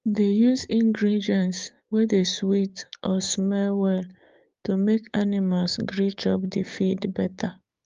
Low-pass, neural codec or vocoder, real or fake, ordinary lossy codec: 7.2 kHz; codec, 16 kHz, 6 kbps, DAC; fake; Opus, 32 kbps